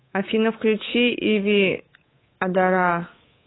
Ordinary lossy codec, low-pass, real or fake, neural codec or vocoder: AAC, 16 kbps; 7.2 kHz; fake; codec, 24 kHz, 3.1 kbps, DualCodec